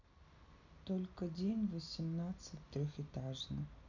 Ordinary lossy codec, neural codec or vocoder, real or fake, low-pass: none; none; real; 7.2 kHz